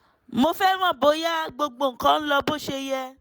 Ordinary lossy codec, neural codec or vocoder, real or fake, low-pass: none; none; real; none